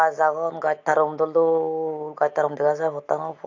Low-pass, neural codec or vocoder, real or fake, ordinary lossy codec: 7.2 kHz; none; real; AAC, 48 kbps